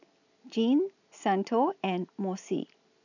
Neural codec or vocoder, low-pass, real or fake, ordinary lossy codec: none; 7.2 kHz; real; none